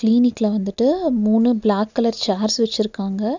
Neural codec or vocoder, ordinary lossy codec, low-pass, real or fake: none; none; 7.2 kHz; real